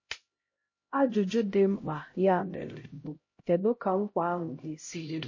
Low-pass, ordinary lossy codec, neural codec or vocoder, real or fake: 7.2 kHz; MP3, 32 kbps; codec, 16 kHz, 0.5 kbps, X-Codec, HuBERT features, trained on LibriSpeech; fake